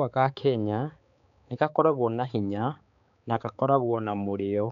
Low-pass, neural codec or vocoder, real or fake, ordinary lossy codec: 7.2 kHz; codec, 16 kHz, 4 kbps, X-Codec, HuBERT features, trained on balanced general audio; fake; none